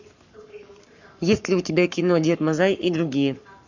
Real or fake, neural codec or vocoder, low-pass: fake; codec, 44.1 kHz, 7.8 kbps, Pupu-Codec; 7.2 kHz